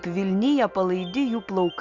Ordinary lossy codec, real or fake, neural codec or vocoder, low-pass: Opus, 64 kbps; real; none; 7.2 kHz